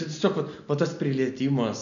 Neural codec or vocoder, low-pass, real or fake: none; 7.2 kHz; real